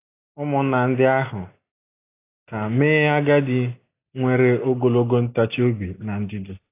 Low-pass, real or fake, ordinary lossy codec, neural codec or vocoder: 3.6 kHz; real; AAC, 24 kbps; none